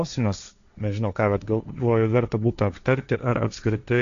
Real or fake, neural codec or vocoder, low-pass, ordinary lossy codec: fake; codec, 16 kHz, 1.1 kbps, Voila-Tokenizer; 7.2 kHz; AAC, 96 kbps